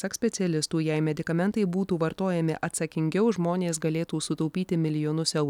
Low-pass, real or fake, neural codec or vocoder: 19.8 kHz; real; none